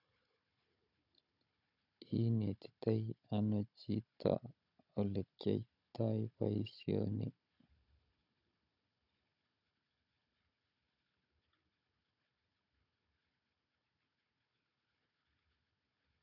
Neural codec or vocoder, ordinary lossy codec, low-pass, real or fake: codec, 16 kHz, 16 kbps, FunCodec, trained on Chinese and English, 50 frames a second; none; 5.4 kHz; fake